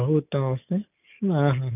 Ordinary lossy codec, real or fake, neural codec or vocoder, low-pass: none; real; none; 3.6 kHz